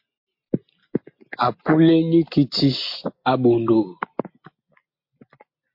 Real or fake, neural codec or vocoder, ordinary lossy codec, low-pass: real; none; MP3, 32 kbps; 5.4 kHz